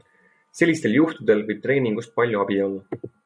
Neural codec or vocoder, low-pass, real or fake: none; 9.9 kHz; real